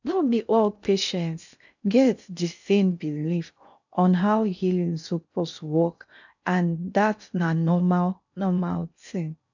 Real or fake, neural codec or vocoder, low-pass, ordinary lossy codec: fake; codec, 16 kHz in and 24 kHz out, 0.6 kbps, FocalCodec, streaming, 2048 codes; 7.2 kHz; none